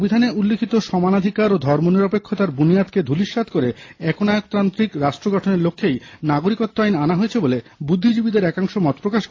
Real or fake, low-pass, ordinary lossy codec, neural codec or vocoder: real; 7.2 kHz; AAC, 32 kbps; none